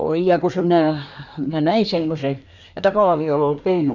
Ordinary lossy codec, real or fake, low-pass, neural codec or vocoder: none; fake; 7.2 kHz; codec, 24 kHz, 1 kbps, SNAC